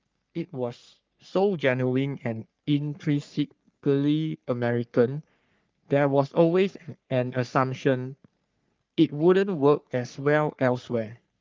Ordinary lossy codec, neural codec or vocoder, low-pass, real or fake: Opus, 32 kbps; codec, 44.1 kHz, 3.4 kbps, Pupu-Codec; 7.2 kHz; fake